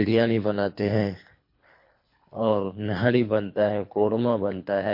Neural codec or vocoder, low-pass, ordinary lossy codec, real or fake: codec, 24 kHz, 3 kbps, HILCodec; 5.4 kHz; MP3, 32 kbps; fake